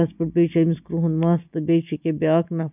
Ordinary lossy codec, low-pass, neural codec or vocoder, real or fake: none; 3.6 kHz; none; real